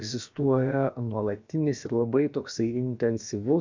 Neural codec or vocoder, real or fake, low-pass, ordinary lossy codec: codec, 16 kHz, about 1 kbps, DyCAST, with the encoder's durations; fake; 7.2 kHz; MP3, 64 kbps